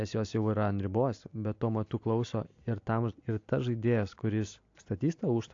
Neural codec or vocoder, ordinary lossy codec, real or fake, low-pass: none; AAC, 48 kbps; real; 7.2 kHz